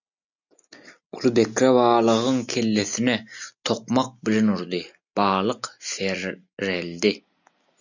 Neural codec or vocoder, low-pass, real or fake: none; 7.2 kHz; real